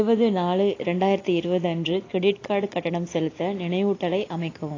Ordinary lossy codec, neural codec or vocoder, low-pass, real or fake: AAC, 32 kbps; none; 7.2 kHz; real